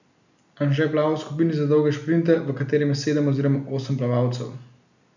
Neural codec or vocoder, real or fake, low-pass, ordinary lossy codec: none; real; 7.2 kHz; none